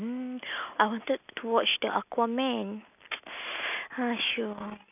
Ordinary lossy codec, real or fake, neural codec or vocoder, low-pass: none; real; none; 3.6 kHz